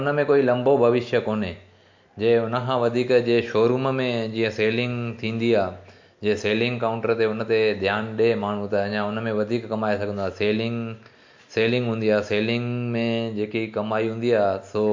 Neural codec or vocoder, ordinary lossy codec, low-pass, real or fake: none; MP3, 48 kbps; 7.2 kHz; real